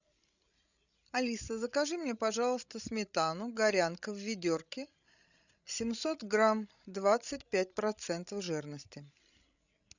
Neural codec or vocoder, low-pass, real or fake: codec, 16 kHz, 16 kbps, FreqCodec, larger model; 7.2 kHz; fake